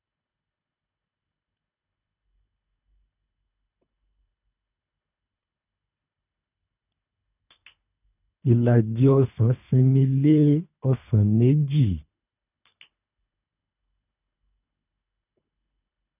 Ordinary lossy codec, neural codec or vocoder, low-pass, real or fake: none; codec, 24 kHz, 3 kbps, HILCodec; 3.6 kHz; fake